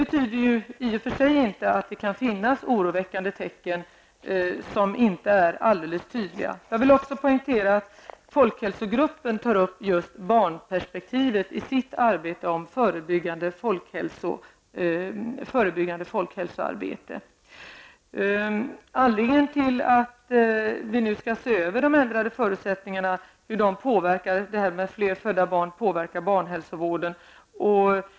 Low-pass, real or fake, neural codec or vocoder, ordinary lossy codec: none; real; none; none